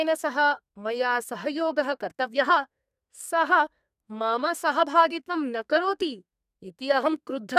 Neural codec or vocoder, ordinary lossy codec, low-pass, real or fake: codec, 32 kHz, 1.9 kbps, SNAC; none; 14.4 kHz; fake